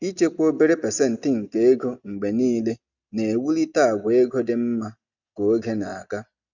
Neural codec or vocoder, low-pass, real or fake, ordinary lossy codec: none; 7.2 kHz; real; none